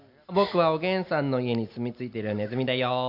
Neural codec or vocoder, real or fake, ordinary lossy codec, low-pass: none; real; none; 5.4 kHz